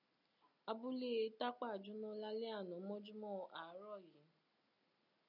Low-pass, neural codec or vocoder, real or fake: 5.4 kHz; none; real